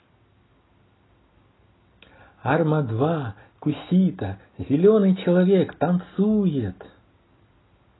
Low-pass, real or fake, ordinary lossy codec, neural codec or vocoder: 7.2 kHz; real; AAC, 16 kbps; none